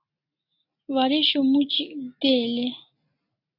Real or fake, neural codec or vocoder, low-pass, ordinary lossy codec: real; none; 5.4 kHz; AAC, 32 kbps